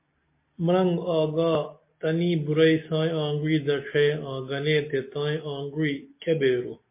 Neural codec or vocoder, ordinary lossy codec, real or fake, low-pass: none; MP3, 24 kbps; real; 3.6 kHz